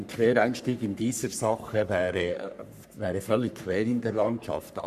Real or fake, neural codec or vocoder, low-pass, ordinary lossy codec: fake; codec, 44.1 kHz, 3.4 kbps, Pupu-Codec; 14.4 kHz; none